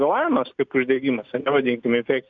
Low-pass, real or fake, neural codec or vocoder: 7.2 kHz; real; none